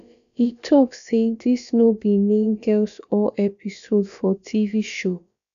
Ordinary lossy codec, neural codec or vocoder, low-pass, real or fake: none; codec, 16 kHz, about 1 kbps, DyCAST, with the encoder's durations; 7.2 kHz; fake